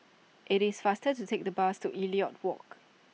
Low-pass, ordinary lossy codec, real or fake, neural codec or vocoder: none; none; real; none